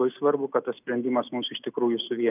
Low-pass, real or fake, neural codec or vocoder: 3.6 kHz; real; none